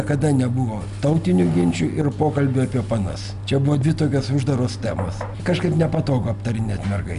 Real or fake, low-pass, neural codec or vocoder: real; 10.8 kHz; none